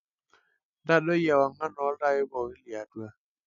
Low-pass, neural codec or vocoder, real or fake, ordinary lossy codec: 7.2 kHz; none; real; none